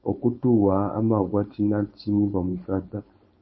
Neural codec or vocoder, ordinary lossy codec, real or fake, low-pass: codec, 16 kHz, 4.8 kbps, FACodec; MP3, 24 kbps; fake; 7.2 kHz